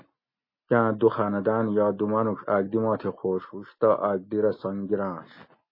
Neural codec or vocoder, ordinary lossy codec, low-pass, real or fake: none; MP3, 24 kbps; 5.4 kHz; real